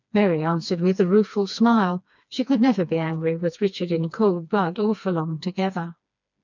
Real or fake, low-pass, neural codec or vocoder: fake; 7.2 kHz; codec, 16 kHz, 2 kbps, FreqCodec, smaller model